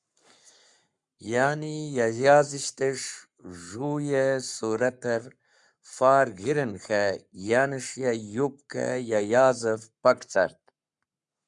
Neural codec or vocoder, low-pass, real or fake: codec, 44.1 kHz, 7.8 kbps, Pupu-Codec; 10.8 kHz; fake